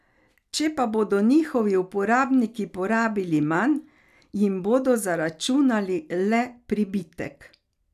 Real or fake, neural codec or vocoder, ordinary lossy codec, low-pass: real; none; none; 14.4 kHz